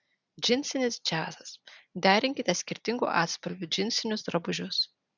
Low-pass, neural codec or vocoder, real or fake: 7.2 kHz; none; real